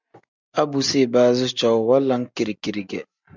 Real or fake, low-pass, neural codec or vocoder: real; 7.2 kHz; none